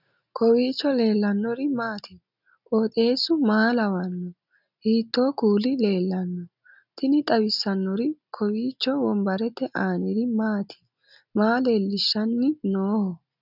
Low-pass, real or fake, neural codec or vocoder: 5.4 kHz; real; none